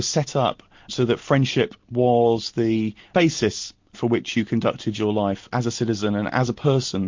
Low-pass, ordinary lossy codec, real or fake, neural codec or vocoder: 7.2 kHz; MP3, 48 kbps; real; none